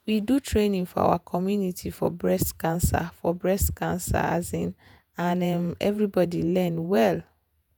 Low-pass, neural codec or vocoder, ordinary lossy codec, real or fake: none; vocoder, 48 kHz, 128 mel bands, Vocos; none; fake